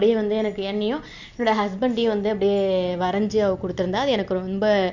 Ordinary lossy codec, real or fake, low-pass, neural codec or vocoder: none; real; 7.2 kHz; none